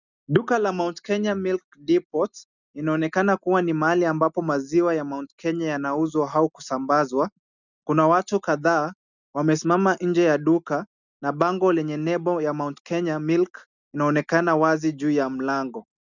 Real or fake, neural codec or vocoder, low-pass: real; none; 7.2 kHz